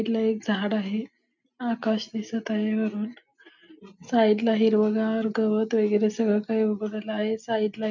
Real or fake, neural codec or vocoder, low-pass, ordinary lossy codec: real; none; 7.2 kHz; none